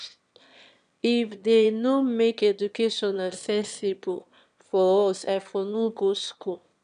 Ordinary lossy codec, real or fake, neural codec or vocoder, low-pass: none; fake; autoencoder, 22.05 kHz, a latent of 192 numbers a frame, VITS, trained on one speaker; 9.9 kHz